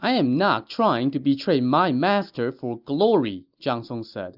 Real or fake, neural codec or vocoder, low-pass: real; none; 5.4 kHz